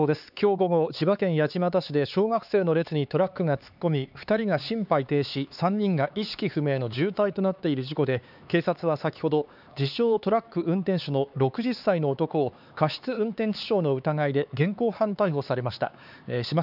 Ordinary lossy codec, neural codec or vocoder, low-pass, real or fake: none; codec, 16 kHz, 4 kbps, X-Codec, HuBERT features, trained on LibriSpeech; 5.4 kHz; fake